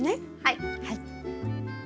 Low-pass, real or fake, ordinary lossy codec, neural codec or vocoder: none; real; none; none